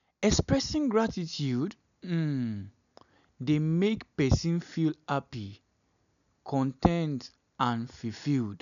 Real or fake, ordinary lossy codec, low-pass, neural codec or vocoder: real; none; 7.2 kHz; none